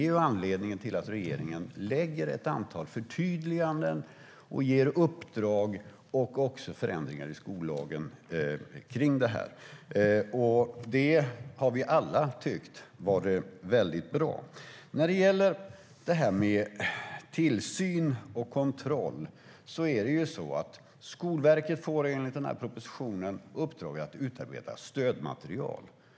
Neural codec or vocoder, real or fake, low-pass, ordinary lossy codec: none; real; none; none